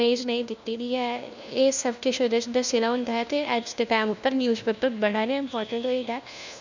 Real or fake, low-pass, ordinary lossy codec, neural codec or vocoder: fake; 7.2 kHz; none; codec, 16 kHz, 1 kbps, FunCodec, trained on LibriTTS, 50 frames a second